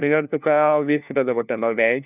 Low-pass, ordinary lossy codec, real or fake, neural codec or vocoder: 3.6 kHz; none; fake; codec, 16 kHz, 1 kbps, FunCodec, trained on LibriTTS, 50 frames a second